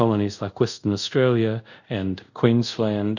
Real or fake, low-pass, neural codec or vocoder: fake; 7.2 kHz; codec, 24 kHz, 0.5 kbps, DualCodec